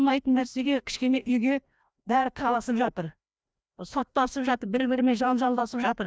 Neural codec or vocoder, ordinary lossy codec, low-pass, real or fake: codec, 16 kHz, 1 kbps, FreqCodec, larger model; none; none; fake